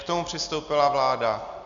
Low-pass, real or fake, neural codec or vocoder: 7.2 kHz; real; none